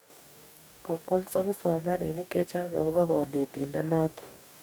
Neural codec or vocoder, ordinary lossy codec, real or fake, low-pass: codec, 44.1 kHz, 2.6 kbps, DAC; none; fake; none